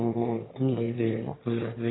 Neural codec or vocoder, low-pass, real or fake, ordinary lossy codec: autoencoder, 22.05 kHz, a latent of 192 numbers a frame, VITS, trained on one speaker; 7.2 kHz; fake; AAC, 16 kbps